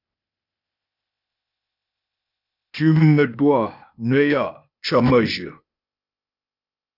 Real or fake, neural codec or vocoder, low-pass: fake; codec, 16 kHz, 0.8 kbps, ZipCodec; 5.4 kHz